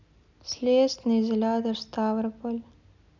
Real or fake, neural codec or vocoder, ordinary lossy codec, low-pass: real; none; none; 7.2 kHz